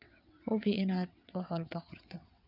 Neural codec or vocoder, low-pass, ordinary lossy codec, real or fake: vocoder, 22.05 kHz, 80 mel bands, WaveNeXt; 5.4 kHz; none; fake